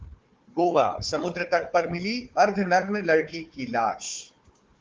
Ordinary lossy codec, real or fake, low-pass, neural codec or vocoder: Opus, 24 kbps; fake; 7.2 kHz; codec, 16 kHz, 4 kbps, FunCodec, trained on Chinese and English, 50 frames a second